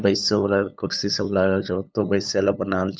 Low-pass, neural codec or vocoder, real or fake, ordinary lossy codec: none; codec, 16 kHz, 8 kbps, FunCodec, trained on LibriTTS, 25 frames a second; fake; none